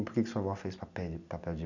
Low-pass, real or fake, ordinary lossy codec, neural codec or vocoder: 7.2 kHz; real; none; none